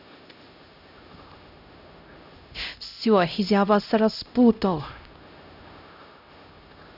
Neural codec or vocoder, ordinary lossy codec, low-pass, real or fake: codec, 16 kHz, 0.5 kbps, X-Codec, HuBERT features, trained on LibriSpeech; none; 5.4 kHz; fake